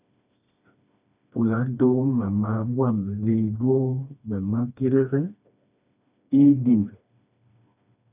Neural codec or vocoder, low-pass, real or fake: codec, 16 kHz, 2 kbps, FreqCodec, smaller model; 3.6 kHz; fake